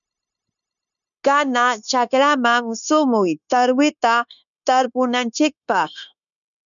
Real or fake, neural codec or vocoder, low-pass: fake; codec, 16 kHz, 0.9 kbps, LongCat-Audio-Codec; 7.2 kHz